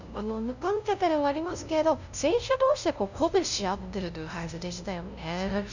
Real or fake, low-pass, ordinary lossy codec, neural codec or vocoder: fake; 7.2 kHz; none; codec, 16 kHz, 0.5 kbps, FunCodec, trained on LibriTTS, 25 frames a second